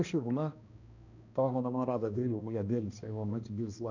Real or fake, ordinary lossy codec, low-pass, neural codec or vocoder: fake; none; 7.2 kHz; codec, 16 kHz, 1 kbps, X-Codec, HuBERT features, trained on balanced general audio